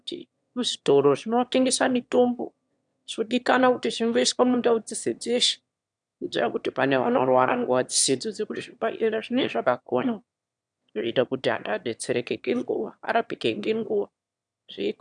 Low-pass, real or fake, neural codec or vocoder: 9.9 kHz; fake; autoencoder, 22.05 kHz, a latent of 192 numbers a frame, VITS, trained on one speaker